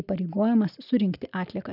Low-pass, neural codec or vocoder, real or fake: 5.4 kHz; none; real